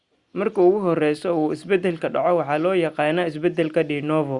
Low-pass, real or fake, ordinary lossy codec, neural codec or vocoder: 10.8 kHz; real; Opus, 64 kbps; none